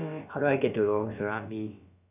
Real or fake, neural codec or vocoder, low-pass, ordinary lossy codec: fake; codec, 16 kHz, about 1 kbps, DyCAST, with the encoder's durations; 3.6 kHz; none